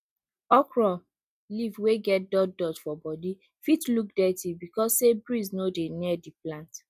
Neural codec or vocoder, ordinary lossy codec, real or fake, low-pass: none; none; real; 14.4 kHz